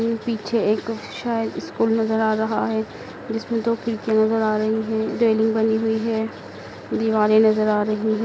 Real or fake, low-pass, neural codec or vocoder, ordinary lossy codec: real; none; none; none